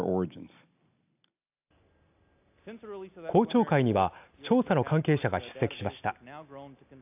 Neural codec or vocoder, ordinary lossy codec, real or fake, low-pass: none; AAC, 32 kbps; real; 3.6 kHz